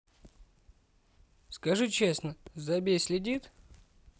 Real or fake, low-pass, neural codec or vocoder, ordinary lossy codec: real; none; none; none